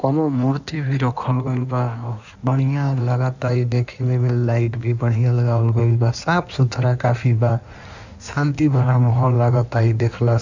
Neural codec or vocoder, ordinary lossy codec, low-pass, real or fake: codec, 16 kHz in and 24 kHz out, 1.1 kbps, FireRedTTS-2 codec; none; 7.2 kHz; fake